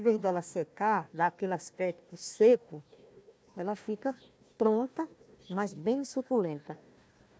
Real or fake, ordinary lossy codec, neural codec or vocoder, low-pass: fake; none; codec, 16 kHz, 1 kbps, FunCodec, trained on Chinese and English, 50 frames a second; none